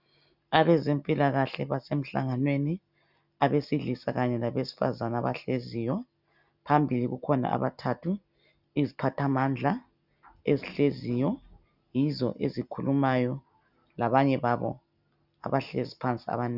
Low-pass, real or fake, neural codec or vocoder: 5.4 kHz; real; none